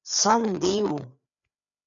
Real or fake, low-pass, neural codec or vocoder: fake; 7.2 kHz; codec, 16 kHz, 4 kbps, FreqCodec, larger model